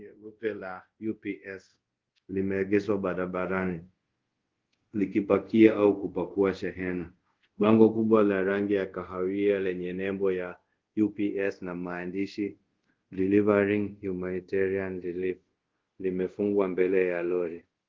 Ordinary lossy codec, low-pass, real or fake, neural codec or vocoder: Opus, 16 kbps; 7.2 kHz; fake; codec, 24 kHz, 0.5 kbps, DualCodec